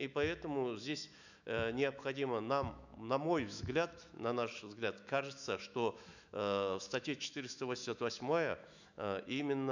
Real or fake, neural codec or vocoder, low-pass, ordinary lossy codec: fake; autoencoder, 48 kHz, 128 numbers a frame, DAC-VAE, trained on Japanese speech; 7.2 kHz; none